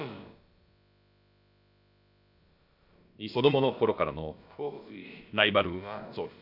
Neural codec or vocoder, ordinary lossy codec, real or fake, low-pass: codec, 16 kHz, about 1 kbps, DyCAST, with the encoder's durations; none; fake; 5.4 kHz